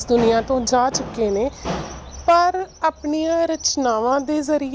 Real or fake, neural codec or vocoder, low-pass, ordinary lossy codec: real; none; none; none